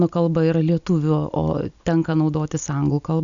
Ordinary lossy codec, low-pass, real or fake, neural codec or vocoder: MP3, 96 kbps; 7.2 kHz; real; none